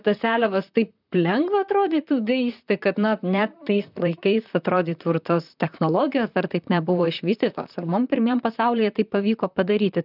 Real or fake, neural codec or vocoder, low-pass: fake; vocoder, 44.1 kHz, 128 mel bands, Pupu-Vocoder; 5.4 kHz